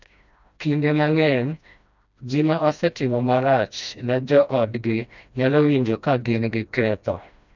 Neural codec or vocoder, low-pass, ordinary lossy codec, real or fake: codec, 16 kHz, 1 kbps, FreqCodec, smaller model; 7.2 kHz; none; fake